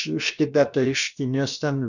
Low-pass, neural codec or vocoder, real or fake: 7.2 kHz; codec, 16 kHz, about 1 kbps, DyCAST, with the encoder's durations; fake